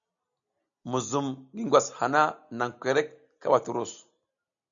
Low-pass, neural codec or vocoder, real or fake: 7.2 kHz; none; real